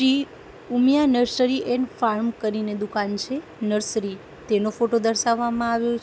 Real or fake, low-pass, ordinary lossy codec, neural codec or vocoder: real; none; none; none